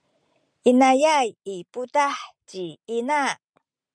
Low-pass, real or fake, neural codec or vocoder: 9.9 kHz; real; none